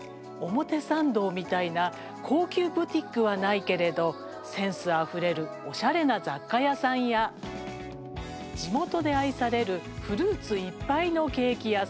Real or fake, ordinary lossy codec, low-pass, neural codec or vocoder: real; none; none; none